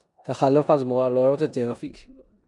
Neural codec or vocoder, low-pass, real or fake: codec, 16 kHz in and 24 kHz out, 0.9 kbps, LongCat-Audio-Codec, four codebook decoder; 10.8 kHz; fake